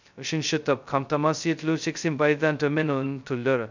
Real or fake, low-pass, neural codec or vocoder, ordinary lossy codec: fake; 7.2 kHz; codec, 16 kHz, 0.2 kbps, FocalCodec; none